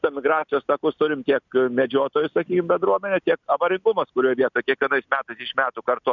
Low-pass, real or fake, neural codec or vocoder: 7.2 kHz; real; none